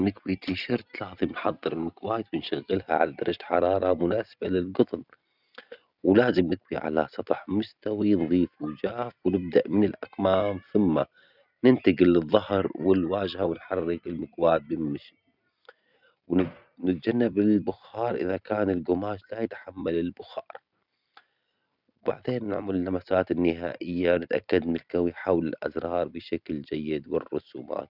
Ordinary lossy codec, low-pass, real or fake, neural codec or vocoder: none; 5.4 kHz; real; none